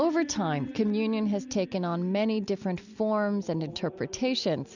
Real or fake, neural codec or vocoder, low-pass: real; none; 7.2 kHz